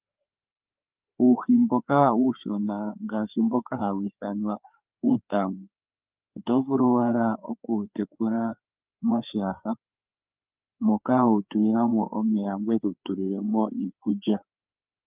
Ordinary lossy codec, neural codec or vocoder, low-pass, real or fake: Opus, 32 kbps; codec, 16 kHz, 4 kbps, FreqCodec, larger model; 3.6 kHz; fake